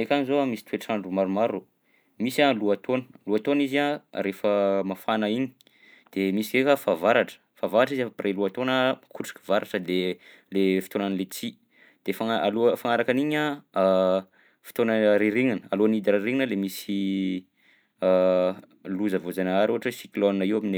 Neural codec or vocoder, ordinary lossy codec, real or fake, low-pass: none; none; real; none